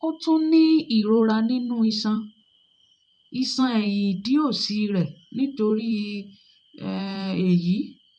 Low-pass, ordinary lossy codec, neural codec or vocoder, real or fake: 9.9 kHz; none; vocoder, 44.1 kHz, 128 mel bands every 512 samples, BigVGAN v2; fake